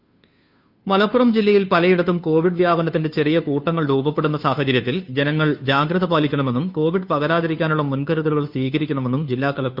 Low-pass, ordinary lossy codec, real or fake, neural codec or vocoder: 5.4 kHz; none; fake; codec, 16 kHz, 2 kbps, FunCodec, trained on Chinese and English, 25 frames a second